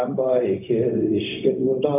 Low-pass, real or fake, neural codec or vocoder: 3.6 kHz; fake; codec, 16 kHz, 0.4 kbps, LongCat-Audio-Codec